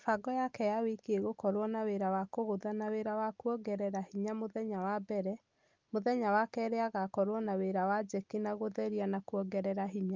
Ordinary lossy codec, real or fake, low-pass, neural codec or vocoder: Opus, 32 kbps; real; 7.2 kHz; none